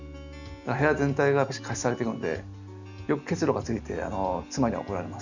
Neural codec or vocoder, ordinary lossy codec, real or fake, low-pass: none; none; real; 7.2 kHz